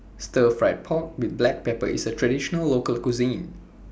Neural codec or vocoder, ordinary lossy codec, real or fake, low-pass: none; none; real; none